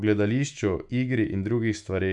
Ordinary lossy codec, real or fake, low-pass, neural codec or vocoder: none; fake; 10.8 kHz; autoencoder, 48 kHz, 128 numbers a frame, DAC-VAE, trained on Japanese speech